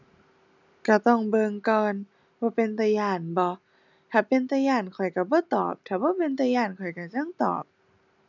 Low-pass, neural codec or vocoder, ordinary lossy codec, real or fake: 7.2 kHz; none; none; real